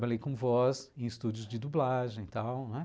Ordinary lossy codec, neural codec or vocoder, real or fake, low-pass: none; none; real; none